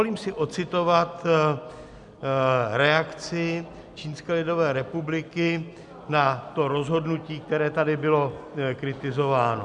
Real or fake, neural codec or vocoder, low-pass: real; none; 10.8 kHz